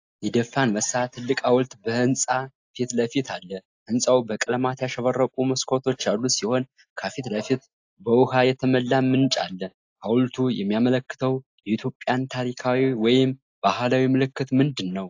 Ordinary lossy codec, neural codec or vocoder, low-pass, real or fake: AAC, 48 kbps; none; 7.2 kHz; real